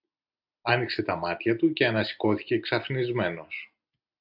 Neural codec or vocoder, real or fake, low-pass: none; real; 5.4 kHz